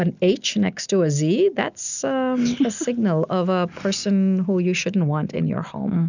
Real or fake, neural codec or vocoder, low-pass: real; none; 7.2 kHz